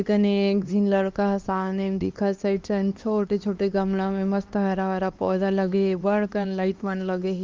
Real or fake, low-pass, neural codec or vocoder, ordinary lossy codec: fake; 7.2 kHz; codec, 16 kHz, 2 kbps, X-Codec, HuBERT features, trained on LibriSpeech; Opus, 32 kbps